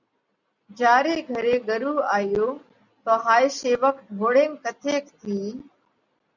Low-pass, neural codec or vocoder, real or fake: 7.2 kHz; none; real